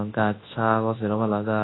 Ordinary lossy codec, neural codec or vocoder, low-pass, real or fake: AAC, 16 kbps; codec, 24 kHz, 0.9 kbps, WavTokenizer, large speech release; 7.2 kHz; fake